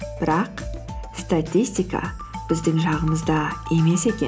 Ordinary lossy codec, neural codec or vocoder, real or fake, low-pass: none; none; real; none